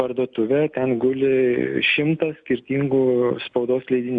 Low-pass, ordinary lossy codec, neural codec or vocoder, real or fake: 9.9 kHz; Opus, 64 kbps; none; real